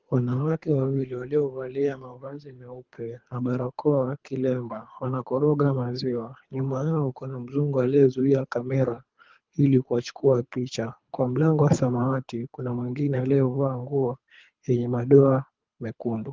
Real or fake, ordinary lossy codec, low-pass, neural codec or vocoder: fake; Opus, 32 kbps; 7.2 kHz; codec, 24 kHz, 3 kbps, HILCodec